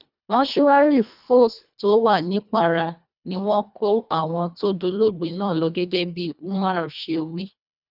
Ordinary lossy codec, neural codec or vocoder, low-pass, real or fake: none; codec, 24 kHz, 1.5 kbps, HILCodec; 5.4 kHz; fake